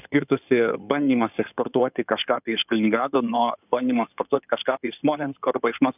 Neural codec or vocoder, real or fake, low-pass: codec, 16 kHz in and 24 kHz out, 2.2 kbps, FireRedTTS-2 codec; fake; 3.6 kHz